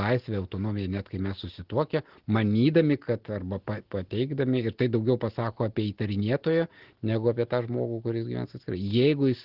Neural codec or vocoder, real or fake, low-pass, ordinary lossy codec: none; real; 5.4 kHz; Opus, 16 kbps